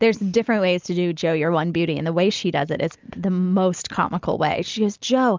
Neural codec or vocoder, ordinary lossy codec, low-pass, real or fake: none; Opus, 32 kbps; 7.2 kHz; real